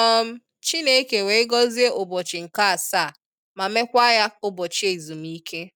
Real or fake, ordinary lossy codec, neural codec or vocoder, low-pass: real; none; none; 19.8 kHz